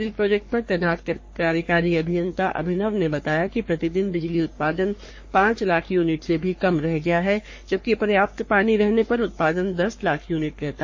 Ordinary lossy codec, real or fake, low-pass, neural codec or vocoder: MP3, 32 kbps; fake; 7.2 kHz; codec, 44.1 kHz, 3.4 kbps, Pupu-Codec